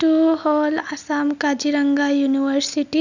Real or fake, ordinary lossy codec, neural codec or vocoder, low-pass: real; none; none; 7.2 kHz